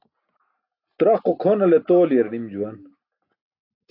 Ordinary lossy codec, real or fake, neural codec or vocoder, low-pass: AAC, 32 kbps; real; none; 5.4 kHz